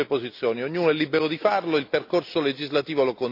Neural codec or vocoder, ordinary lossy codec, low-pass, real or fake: none; AAC, 48 kbps; 5.4 kHz; real